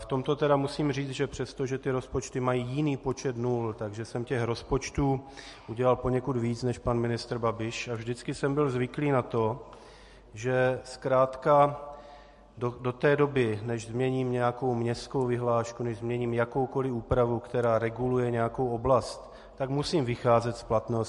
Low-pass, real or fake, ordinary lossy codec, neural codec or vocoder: 14.4 kHz; real; MP3, 48 kbps; none